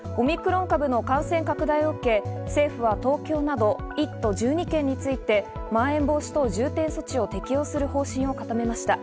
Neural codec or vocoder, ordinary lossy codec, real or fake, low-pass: none; none; real; none